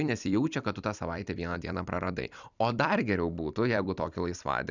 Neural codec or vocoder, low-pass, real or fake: vocoder, 44.1 kHz, 128 mel bands every 256 samples, BigVGAN v2; 7.2 kHz; fake